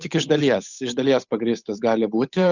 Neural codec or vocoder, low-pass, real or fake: codec, 16 kHz, 8 kbps, FunCodec, trained on Chinese and English, 25 frames a second; 7.2 kHz; fake